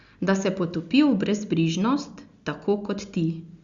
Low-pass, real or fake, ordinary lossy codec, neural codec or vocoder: 7.2 kHz; real; Opus, 64 kbps; none